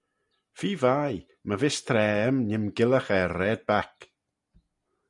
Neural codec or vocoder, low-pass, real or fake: none; 10.8 kHz; real